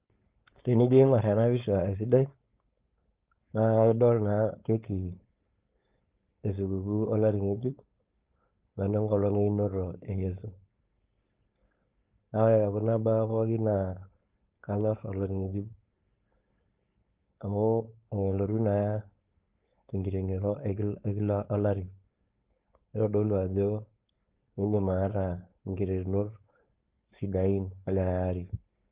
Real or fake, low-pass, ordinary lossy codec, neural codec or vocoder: fake; 3.6 kHz; Opus, 32 kbps; codec, 16 kHz, 4.8 kbps, FACodec